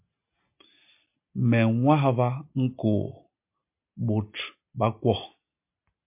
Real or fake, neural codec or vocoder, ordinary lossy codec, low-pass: real; none; MP3, 32 kbps; 3.6 kHz